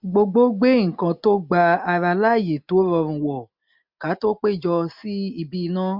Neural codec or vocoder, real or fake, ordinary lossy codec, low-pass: none; real; MP3, 48 kbps; 5.4 kHz